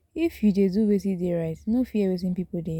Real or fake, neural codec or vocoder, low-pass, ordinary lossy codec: real; none; 19.8 kHz; none